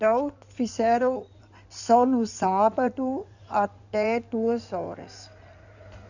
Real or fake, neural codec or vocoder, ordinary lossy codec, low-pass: fake; codec, 16 kHz in and 24 kHz out, 2.2 kbps, FireRedTTS-2 codec; none; 7.2 kHz